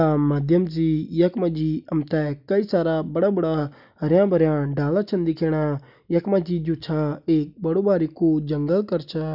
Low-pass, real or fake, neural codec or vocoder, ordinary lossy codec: 5.4 kHz; real; none; none